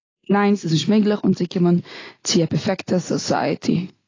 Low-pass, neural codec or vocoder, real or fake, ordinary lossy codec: 7.2 kHz; none; real; AAC, 32 kbps